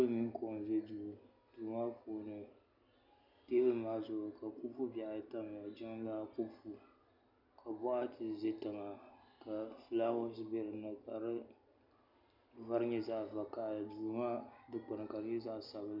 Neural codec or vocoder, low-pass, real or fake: none; 5.4 kHz; real